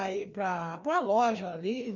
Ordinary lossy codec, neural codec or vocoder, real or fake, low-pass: none; codec, 16 kHz, 2 kbps, FreqCodec, larger model; fake; 7.2 kHz